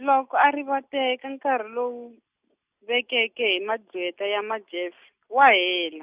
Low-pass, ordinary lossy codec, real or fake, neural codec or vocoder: 3.6 kHz; Opus, 64 kbps; real; none